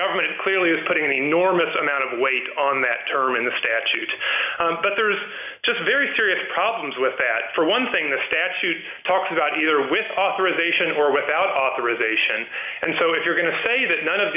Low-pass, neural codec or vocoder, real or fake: 3.6 kHz; none; real